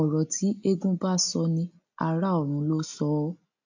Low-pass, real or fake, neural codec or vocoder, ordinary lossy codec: 7.2 kHz; real; none; AAC, 48 kbps